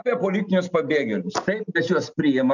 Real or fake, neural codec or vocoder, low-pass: real; none; 7.2 kHz